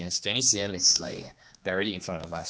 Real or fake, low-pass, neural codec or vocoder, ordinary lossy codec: fake; none; codec, 16 kHz, 2 kbps, X-Codec, HuBERT features, trained on general audio; none